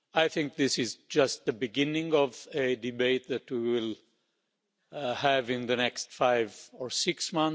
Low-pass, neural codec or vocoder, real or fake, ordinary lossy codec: none; none; real; none